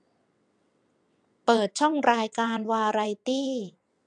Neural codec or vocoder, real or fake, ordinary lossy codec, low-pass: vocoder, 22.05 kHz, 80 mel bands, WaveNeXt; fake; none; 9.9 kHz